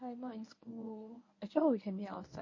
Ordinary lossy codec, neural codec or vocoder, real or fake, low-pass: MP3, 32 kbps; codec, 24 kHz, 0.9 kbps, WavTokenizer, medium speech release version 1; fake; 7.2 kHz